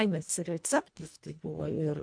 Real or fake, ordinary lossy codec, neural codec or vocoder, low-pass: fake; MP3, 64 kbps; codec, 24 kHz, 1.5 kbps, HILCodec; 9.9 kHz